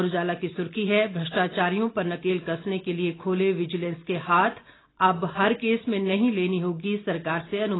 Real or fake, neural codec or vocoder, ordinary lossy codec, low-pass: real; none; AAC, 16 kbps; 7.2 kHz